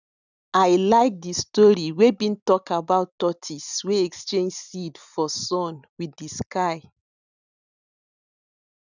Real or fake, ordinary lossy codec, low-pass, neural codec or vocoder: real; none; 7.2 kHz; none